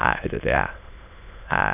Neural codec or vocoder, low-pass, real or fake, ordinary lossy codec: autoencoder, 22.05 kHz, a latent of 192 numbers a frame, VITS, trained on many speakers; 3.6 kHz; fake; none